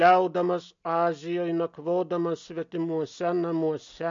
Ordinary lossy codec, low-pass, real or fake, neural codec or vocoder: MP3, 48 kbps; 7.2 kHz; real; none